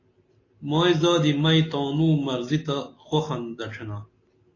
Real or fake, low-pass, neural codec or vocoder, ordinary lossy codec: real; 7.2 kHz; none; AAC, 32 kbps